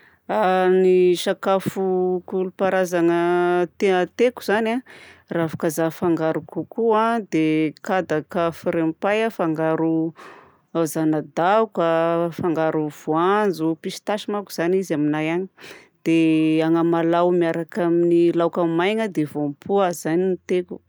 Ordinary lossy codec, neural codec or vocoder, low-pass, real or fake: none; none; none; real